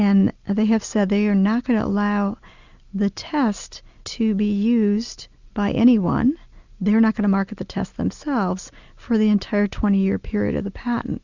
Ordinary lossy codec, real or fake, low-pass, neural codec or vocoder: Opus, 64 kbps; real; 7.2 kHz; none